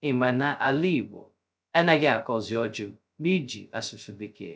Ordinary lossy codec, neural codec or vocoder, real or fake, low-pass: none; codec, 16 kHz, 0.2 kbps, FocalCodec; fake; none